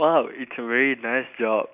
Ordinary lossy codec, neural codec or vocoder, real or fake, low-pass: none; none; real; 3.6 kHz